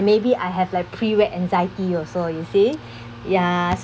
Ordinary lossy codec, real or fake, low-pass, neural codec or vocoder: none; real; none; none